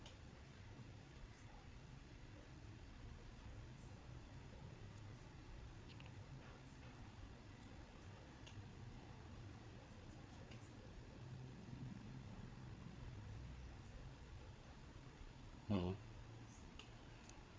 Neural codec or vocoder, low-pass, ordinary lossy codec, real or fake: codec, 16 kHz, 8 kbps, FreqCodec, larger model; none; none; fake